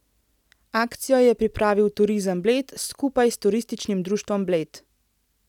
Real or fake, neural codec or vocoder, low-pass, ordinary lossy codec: real; none; 19.8 kHz; none